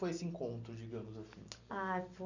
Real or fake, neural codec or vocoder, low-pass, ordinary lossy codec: real; none; 7.2 kHz; none